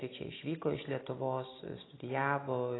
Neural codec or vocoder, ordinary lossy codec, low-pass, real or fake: none; AAC, 16 kbps; 7.2 kHz; real